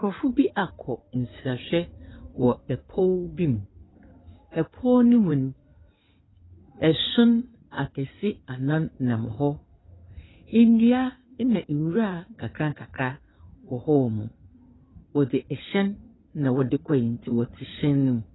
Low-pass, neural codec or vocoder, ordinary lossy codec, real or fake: 7.2 kHz; codec, 16 kHz in and 24 kHz out, 2.2 kbps, FireRedTTS-2 codec; AAC, 16 kbps; fake